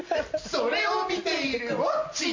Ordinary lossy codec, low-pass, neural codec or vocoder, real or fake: MP3, 64 kbps; 7.2 kHz; none; real